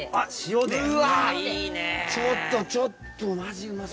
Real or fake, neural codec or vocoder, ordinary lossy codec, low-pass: real; none; none; none